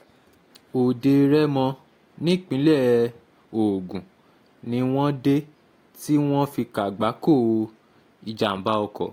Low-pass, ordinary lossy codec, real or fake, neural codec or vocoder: 19.8 kHz; AAC, 48 kbps; real; none